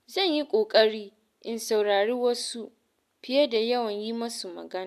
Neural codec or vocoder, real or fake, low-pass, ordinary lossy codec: none; real; 14.4 kHz; AAC, 96 kbps